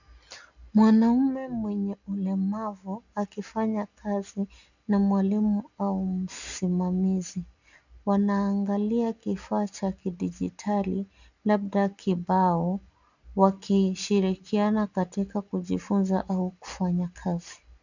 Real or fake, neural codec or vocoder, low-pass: real; none; 7.2 kHz